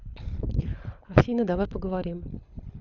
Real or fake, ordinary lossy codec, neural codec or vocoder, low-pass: fake; none; codec, 24 kHz, 6 kbps, HILCodec; 7.2 kHz